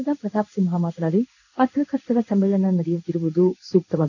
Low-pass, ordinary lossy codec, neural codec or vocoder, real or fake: 7.2 kHz; none; codec, 16 kHz in and 24 kHz out, 1 kbps, XY-Tokenizer; fake